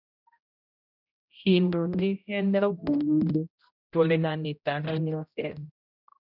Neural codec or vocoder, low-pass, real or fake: codec, 16 kHz, 0.5 kbps, X-Codec, HuBERT features, trained on general audio; 5.4 kHz; fake